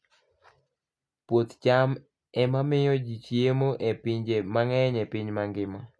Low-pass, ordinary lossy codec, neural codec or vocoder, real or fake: none; none; none; real